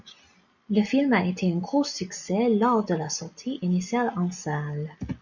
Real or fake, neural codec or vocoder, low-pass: real; none; 7.2 kHz